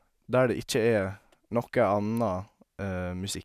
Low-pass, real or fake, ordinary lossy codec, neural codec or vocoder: 14.4 kHz; real; none; none